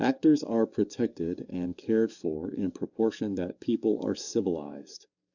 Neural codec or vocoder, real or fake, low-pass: codec, 44.1 kHz, 7.8 kbps, DAC; fake; 7.2 kHz